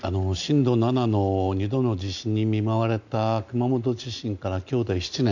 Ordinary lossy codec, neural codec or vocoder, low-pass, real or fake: none; none; 7.2 kHz; real